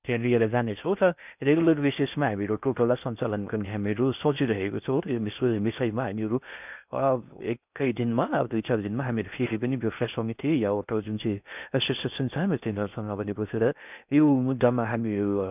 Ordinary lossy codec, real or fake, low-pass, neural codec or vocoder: none; fake; 3.6 kHz; codec, 16 kHz in and 24 kHz out, 0.6 kbps, FocalCodec, streaming, 2048 codes